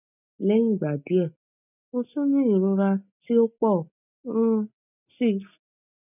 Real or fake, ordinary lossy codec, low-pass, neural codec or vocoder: real; none; 3.6 kHz; none